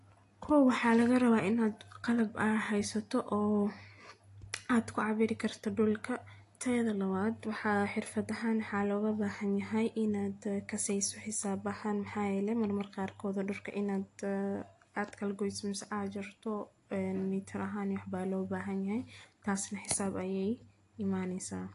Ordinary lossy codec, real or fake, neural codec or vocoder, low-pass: AAC, 48 kbps; real; none; 10.8 kHz